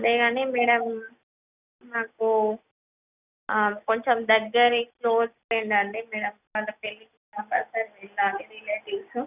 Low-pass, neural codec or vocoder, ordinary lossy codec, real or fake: 3.6 kHz; none; none; real